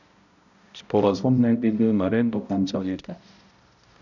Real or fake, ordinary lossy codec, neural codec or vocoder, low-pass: fake; none; codec, 16 kHz, 0.5 kbps, X-Codec, HuBERT features, trained on balanced general audio; 7.2 kHz